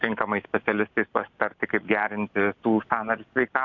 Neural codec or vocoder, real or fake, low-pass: none; real; 7.2 kHz